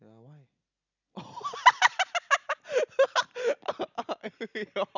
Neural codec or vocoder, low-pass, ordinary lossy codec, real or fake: none; 7.2 kHz; none; real